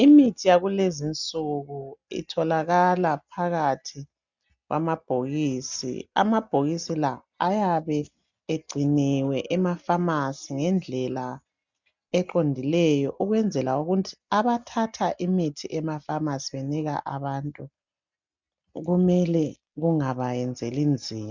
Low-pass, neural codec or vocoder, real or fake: 7.2 kHz; none; real